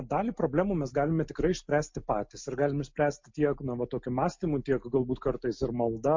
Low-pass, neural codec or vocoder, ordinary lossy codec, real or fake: 7.2 kHz; none; MP3, 48 kbps; real